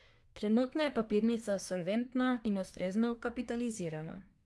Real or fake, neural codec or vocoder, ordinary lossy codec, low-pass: fake; codec, 24 kHz, 1 kbps, SNAC; none; none